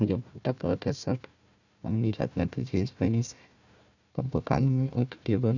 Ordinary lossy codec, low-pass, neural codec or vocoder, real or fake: none; 7.2 kHz; codec, 16 kHz, 1 kbps, FunCodec, trained on Chinese and English, 50 frames a second; fake